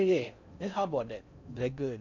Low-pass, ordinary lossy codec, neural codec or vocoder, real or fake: 7.2 kHz; none; codec, 16 kHz in and 24 kHz out, 0.6 kbps, FocalCodec, streaming, 4096 codes; fake